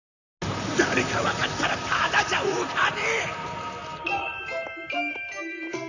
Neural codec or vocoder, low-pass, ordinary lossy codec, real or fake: vocoder, 44.1 kHz, 128 mel bands, Pupu-Vocoder; 7.2 kHz; none; fake